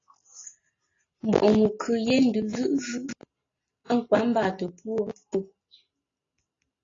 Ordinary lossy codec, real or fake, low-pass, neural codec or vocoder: AAC, 32 kbps; real; 7.2 kHz; none